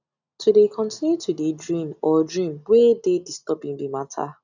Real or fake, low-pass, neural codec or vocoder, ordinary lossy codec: real; 7.2 kHz; none; none